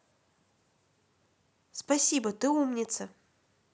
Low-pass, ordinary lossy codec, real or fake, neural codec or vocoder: none; none; real; none